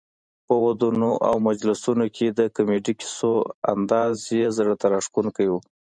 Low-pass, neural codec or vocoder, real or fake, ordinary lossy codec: 9.9 kHz; vocoder, 44.1 kHz, 128 mel bands every 512 samples, BigVGAN v2; fake; MP3, 96 kbps